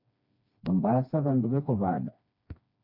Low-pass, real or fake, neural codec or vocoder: 5.4 kHz; fake; codec, 16 kHz, 2 kbps, FreqCodec, smaller model